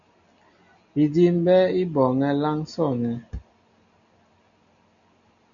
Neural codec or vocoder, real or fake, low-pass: none; real; 7.2 kHz